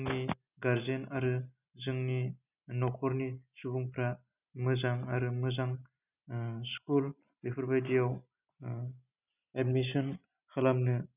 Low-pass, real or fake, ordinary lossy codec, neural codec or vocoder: 3.6 kHz; real; none; none